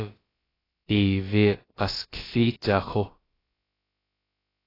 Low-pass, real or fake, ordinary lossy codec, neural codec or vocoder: 5.4 kHz; fake; AAC, 24 kbps; codec, 16 kHz, about 1 kbps, DyCAST, with the encoder's durations